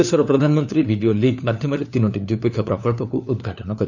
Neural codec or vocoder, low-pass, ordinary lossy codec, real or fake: codec, 16 kHz, 4 kbps, FunCodec, trained on LibriTTS, 50 frames a second; 7.2 kHz; none; fake